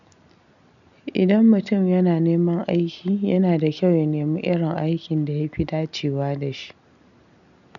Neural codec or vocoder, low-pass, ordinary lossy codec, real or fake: none; 7.2 kHz; none; real